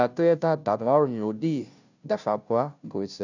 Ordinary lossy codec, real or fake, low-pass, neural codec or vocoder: none; fake; 7.2 kHz; codec, 16 kHz, 0.5 kbps, FunCodec, trained on Chinese and English, 25 frames a second